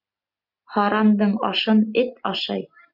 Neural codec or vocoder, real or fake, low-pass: none; real; 5.4 kHz